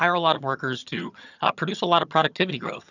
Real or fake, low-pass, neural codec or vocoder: fake; 7.2 kHz; vocoder, 22.05 kHz, 80 mel bands, HiFi-GAN